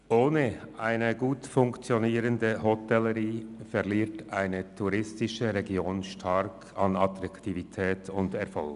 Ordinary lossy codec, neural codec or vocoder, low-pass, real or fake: none; none; 10.8 kHz; real